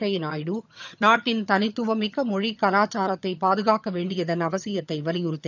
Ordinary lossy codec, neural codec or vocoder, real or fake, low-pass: none; vocoder, 22.05 kHz, 80 mel bands, HiFi-GAN; fake; 7.2 kHz